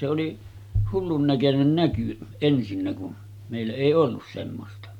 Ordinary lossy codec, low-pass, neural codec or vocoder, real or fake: none; 19.8 kHz; none; real